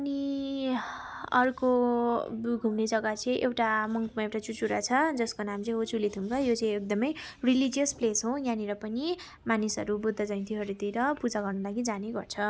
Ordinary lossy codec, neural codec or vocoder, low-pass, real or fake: none; none; none; real